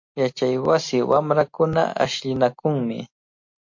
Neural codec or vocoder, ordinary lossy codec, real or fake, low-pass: none; MP3, 48 kbps; real; 7.2 kHz